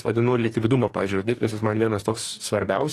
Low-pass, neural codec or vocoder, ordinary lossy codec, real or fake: 14.4 kHz; codec, 44.1 kHz, 2.6 kbps, DAC; AAC, 48 kbps; fake